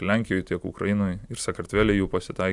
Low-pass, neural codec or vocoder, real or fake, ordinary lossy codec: 10.8 kHz; none; real; Opus, 64 kbps